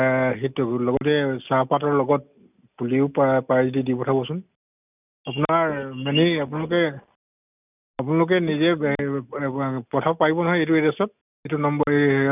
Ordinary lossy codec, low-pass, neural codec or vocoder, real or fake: none; 3.6 kHz; none; real